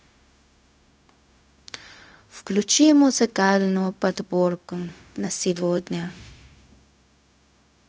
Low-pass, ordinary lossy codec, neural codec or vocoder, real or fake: none; none; codec, 16 kHz, 0.4 kbps, LongCat-Audio-Codec; fake